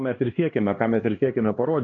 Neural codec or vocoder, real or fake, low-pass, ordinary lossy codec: codec, 16 kHz, 2 kbps, X-Codec, HuBERT features, trained on LibriSpeech; fake; 7.2 kHz; AAC, 32 kbps